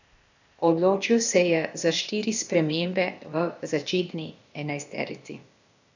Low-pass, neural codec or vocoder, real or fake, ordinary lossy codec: 7.2 kHz; codec, 16 kHz, 0.8 kbps, ZipCodec; fake; none